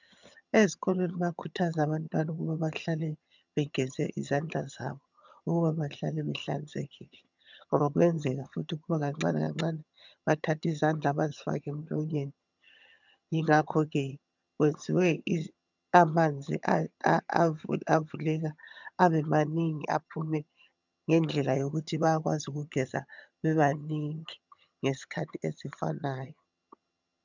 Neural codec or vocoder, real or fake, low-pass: vocoder, 22.05 kHz, 80 mel bands, HiFi-GAN; fake; 7.2 kHz